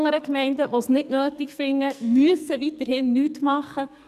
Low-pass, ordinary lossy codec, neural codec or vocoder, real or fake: 14.4 kHz; none; codec, 44.1 kHz, 2.6 kbps, SNAC; fake